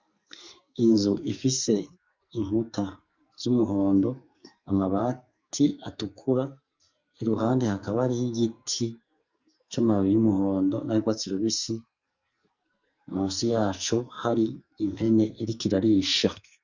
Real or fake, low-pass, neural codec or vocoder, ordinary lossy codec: fake; 7.2 kHz; codec, 44.1 kHz, 2.6 kbps, SNAC; Opus, 64 kbps